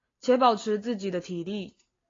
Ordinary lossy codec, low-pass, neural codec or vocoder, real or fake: AAC, 32 kbps; 7.2 kHz; none; real